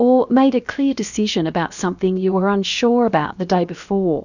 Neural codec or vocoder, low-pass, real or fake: codec, 16 kHz, about 1 kbps, DyCAST, with the encoder's durations; 7.2 kHz; fake